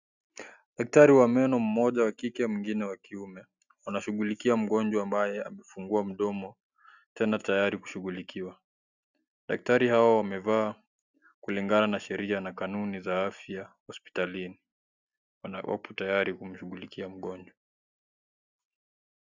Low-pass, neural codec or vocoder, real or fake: 7.2 kHz; none; real